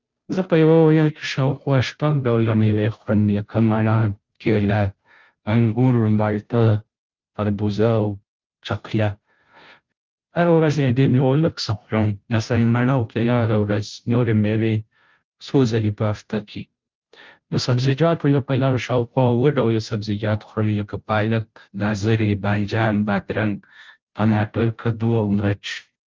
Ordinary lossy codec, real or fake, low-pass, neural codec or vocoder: Opus, 32 kbps; fake; 7.2 kHz; codec, 16 kHz, 0.5 kbps, FunCodec, trained on Chinese and English, 25 frames a second